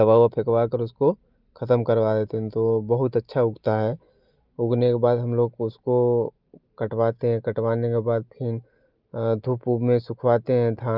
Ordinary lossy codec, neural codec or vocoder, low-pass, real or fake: Opus, 24 kbps; none; 5.4 kHz; real